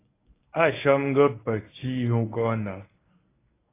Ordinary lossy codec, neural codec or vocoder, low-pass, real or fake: AAC, 16 kbps; codec, 24 kHz, 0.9 kbps, WavTokenizer, medium speech release version 1; 3.6 kHz; fake